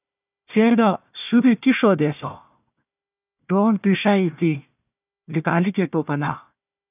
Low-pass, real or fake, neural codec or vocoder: 3.6 kHz; fake; codec, 16 kHz, 1 kbps, FunCodec, trained on Chinese and English, 50 frames a second